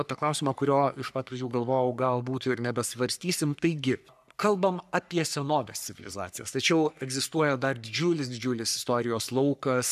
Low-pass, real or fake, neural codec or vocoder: 14.4 kHz; fake; codec, 44.1 kHz, 3.4 kbps, Pupu-Codec